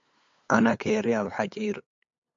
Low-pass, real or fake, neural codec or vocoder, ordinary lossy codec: 7.2 kHz; fake; codec, 16 kHz, 8 kbps, FunCodec, trained on LibriTTS, 25 frames a second; MP3, 48 kbps